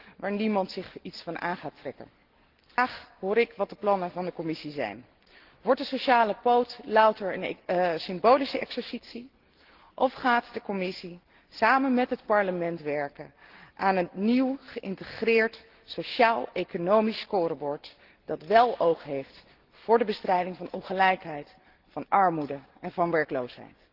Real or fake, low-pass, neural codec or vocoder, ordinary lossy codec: real; 5.4 kHz; none; Opus, 16 kbps